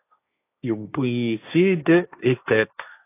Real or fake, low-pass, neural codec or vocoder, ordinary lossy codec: fake; 3.6 kHz; codec, 16 kHz, 1.1 kbps, Voila-Tokenizer; AAC, 24 kbps